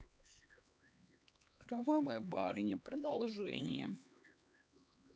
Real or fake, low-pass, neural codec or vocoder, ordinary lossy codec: fake; none; codec, 16 kHz, 2 kbps, X-Codec, HuBERT features, trained on LibriSpeech; none